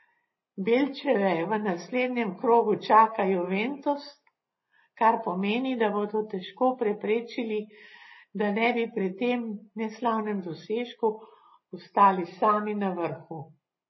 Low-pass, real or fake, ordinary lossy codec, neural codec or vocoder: 7.2 kHz; real; MP3, 24 kbps; none